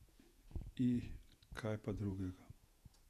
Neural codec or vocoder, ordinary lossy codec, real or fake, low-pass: vocoder, 48 kHz, 128 mel bands, Vocos; none; fake; 14.4 kHz